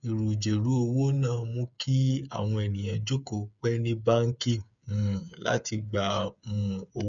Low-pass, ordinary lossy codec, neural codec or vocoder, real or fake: 7.2 kHz; none; codec, 16 kHz, 8 kbps, FreqCodec, smaller model; fake